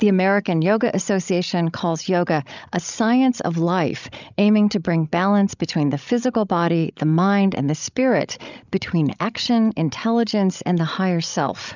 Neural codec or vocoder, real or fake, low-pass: codec, 16 kHz, 16 kbps, FreqCodec, larger model; fake; 7.2 kHz